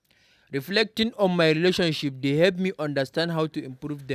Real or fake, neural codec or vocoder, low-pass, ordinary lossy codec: real; none; 14.4 kHz; none